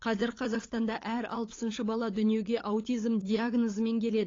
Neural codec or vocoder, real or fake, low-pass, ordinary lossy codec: codec, 16 kHz, 8 kbps, FunCodec, trained on LibriTTS, 25 frames a second; fake; 7.2 kHz; AAC, 32 kbps